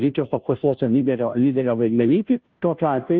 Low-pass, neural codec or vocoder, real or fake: 7.2 kHz; codec, 16 kHz, 0.5 kbps, FunCodec, trained on Chinese and English, 25 frames a second; fake